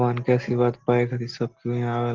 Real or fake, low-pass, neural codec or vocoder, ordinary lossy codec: real; 7.2 kHz; none; Opus, 16 kbps